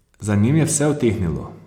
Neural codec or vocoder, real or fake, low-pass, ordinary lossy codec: none; real; 19.8 kHz; Opus, 64 kbps